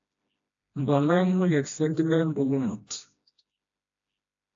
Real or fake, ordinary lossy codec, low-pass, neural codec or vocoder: fake; AAC, 48 kbps; 7.2 kHz; codec, 16 kHz, 1 kbps, FreqCodec, smaller model